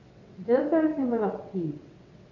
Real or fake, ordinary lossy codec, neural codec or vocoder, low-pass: fake; none; vocoder, 22.05 kHz, 80 mel bands, WaveNeXt; 7.2 kHz